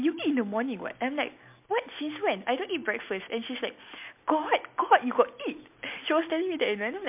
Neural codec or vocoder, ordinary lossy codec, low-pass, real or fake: none; MP3, 32 kbps; 3.6 kHz; real